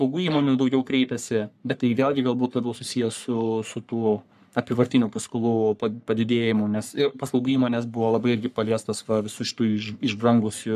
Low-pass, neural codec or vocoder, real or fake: 14.4 kHz; codec, 44.1 kHz, 3.4 kbps, Pupu-Codec; fake